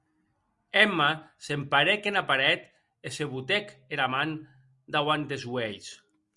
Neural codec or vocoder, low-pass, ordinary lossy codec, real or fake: none; 10.8 kHz; Opus, 64 kbps; real